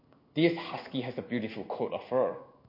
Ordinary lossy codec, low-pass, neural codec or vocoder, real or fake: MP3, 32 kbps; 5.4 kHz; codec, 16 kHz in and 24 kHz out, 1 kbps, XY-Tokenizer; fake